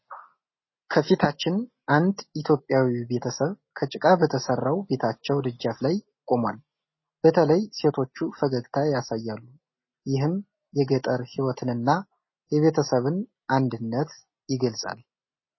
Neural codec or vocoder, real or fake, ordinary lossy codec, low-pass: none; real; MP3, 24 kbps; 7.2 kHz